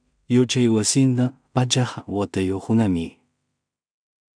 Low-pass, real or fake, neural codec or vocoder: 9.9 kHz; fake; codec, 16 kHz in and 24 kHz out, 0.4 kbps, LongCat-Audio-Codec, two codebook decoder